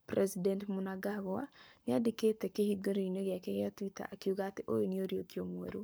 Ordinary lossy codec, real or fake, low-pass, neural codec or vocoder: none; fake; none; codec, 44.1 kHz, 7.8 kbps, Pupu-Codec